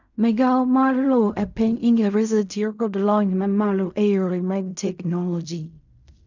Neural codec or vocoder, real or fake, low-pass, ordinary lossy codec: codec, 16 kHz in and 24 kHz out, 0.4 kbps, LongCat-Audio-Codec, fine tuned four codebook decoder; fake; 7.2 kHz; none